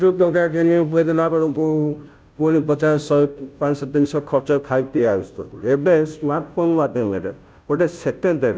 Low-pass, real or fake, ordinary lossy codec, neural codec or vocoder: none; fake; none; codec, 16 kHz, 0.5 kbps, FunCodec, trained on Chinese and English, 25 frames a second